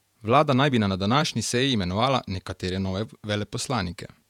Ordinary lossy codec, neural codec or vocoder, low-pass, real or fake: none; vocoder, 48 kHz, 128 mel bands, Vocos; 19.8 kHz; fake